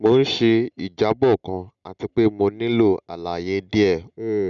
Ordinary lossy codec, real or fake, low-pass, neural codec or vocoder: none; real; 7.2 kHz; none